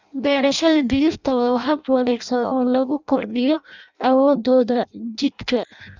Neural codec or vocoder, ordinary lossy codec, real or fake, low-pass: codec, 16 kHz in and 24 kHz out, 0.6 kbps, FireRedTTS-2 codec; none; fake; 7.2 kHz